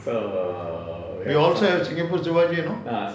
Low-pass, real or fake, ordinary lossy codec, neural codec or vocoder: none; real; none; none